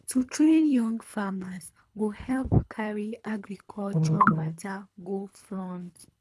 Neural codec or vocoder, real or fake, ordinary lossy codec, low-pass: codec, 24 kHz, 3 kbps, HILCodec; fake; none; none